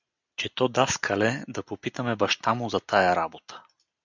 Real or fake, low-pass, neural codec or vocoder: real; 7.2 kHz; none